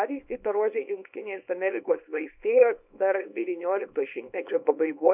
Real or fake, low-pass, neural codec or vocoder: fake; 3.6 kHz; codec, 24 kHz, 0.9 kbps, WavTokenizer, small release